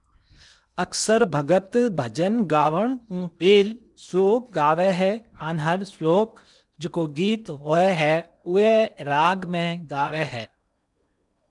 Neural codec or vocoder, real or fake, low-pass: codec, 16 kHz in and 24 kHz out, 0.8 kbps, FocalCodec, streaming, 65536 codes; fake; 10.8 kHz